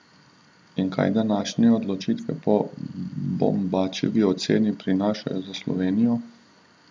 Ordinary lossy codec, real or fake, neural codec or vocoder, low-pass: none; real; none; none